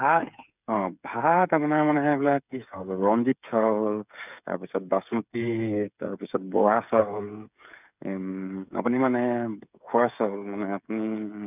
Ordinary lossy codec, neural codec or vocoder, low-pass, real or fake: none; codec, 16 kHz, 8 kbps, FreqCodec, smaller model; 3.6 kHz; fake